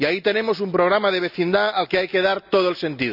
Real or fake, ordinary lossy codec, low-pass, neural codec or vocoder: real; none; 5.4 kHz; none